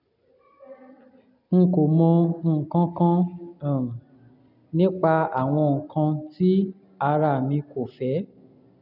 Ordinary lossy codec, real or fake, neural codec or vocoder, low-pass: none; real; none; 5.4 kHz